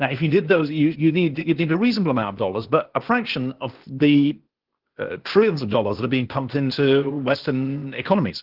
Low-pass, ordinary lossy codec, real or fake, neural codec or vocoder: 5.4 kHz; Opus, 16 kbps; fake; codec, 16 kHz, 0.8 kbps, ZipCodec